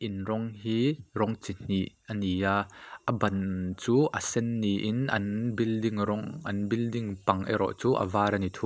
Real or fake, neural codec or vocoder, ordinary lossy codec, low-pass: real; none; none; none